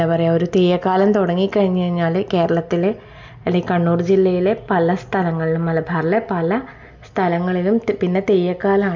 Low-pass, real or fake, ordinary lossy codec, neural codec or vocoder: 7.2 kHz; real; MP3, 64 kbps; none